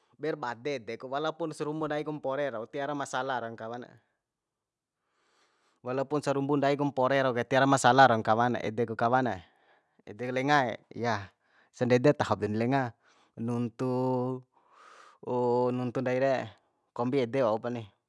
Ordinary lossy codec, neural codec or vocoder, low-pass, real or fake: none; none; none; real